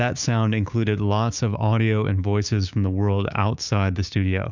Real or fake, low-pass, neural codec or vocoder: fake; 7.2 kHz; codec, 16 kHz, 6 kbps, DAC